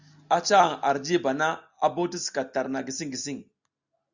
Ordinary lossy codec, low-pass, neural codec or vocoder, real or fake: Opus, 64 kbps; 7.2 kHz; none; real